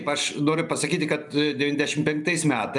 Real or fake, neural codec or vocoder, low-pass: real; none; 10.8 kHz